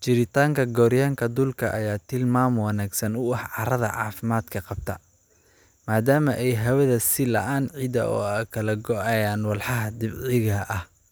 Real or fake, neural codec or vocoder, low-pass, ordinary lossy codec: real; none; none; none